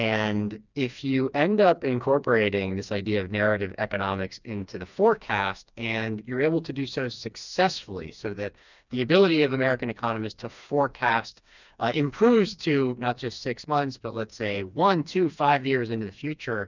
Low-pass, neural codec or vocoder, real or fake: 7.2 kHz; codec, 16 kHz, 2 kbps, FreqCodec, smaller model; fake